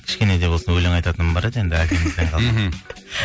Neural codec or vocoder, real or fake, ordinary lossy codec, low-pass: none; real; none; none